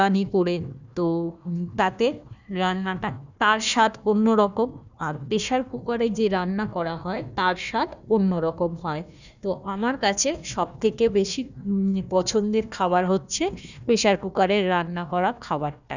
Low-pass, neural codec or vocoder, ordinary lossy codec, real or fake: 7.2 kHz; codec, 16 kHz, 1 kbps, FunCodec, trained on Chinese and English, 50 frames a second; none; fake